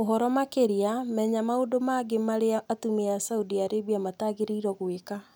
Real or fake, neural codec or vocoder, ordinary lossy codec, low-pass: real; none; none; none